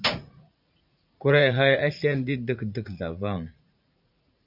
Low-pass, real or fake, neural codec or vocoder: 5.4 kHz; fake; vocoder, 44.1 kHz, 80 mel bands, Vocos